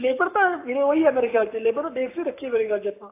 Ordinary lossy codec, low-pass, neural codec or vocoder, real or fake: AAC, 24 kbps; 3.6 kHz; codec, 44.1 kHz, 7.8 kbps, Pupu-Codec; fake